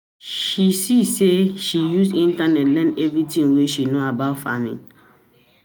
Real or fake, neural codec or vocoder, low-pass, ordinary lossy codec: real; none; none; none